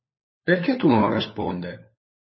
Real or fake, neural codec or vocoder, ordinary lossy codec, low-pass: fake; codec, 16 kHz, 4 kbps, FunCodec, trained on LibriTTS, 50 frames a second; MP3, 24 kbps; 7.2 kHz